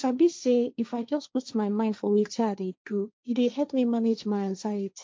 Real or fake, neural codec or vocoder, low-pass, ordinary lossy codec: fake; codec, 16 kHz, 1.1 kbps, Voila-Tokenizer; none; none